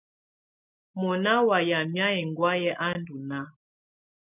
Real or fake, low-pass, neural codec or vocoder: real; 3.6 kHz; none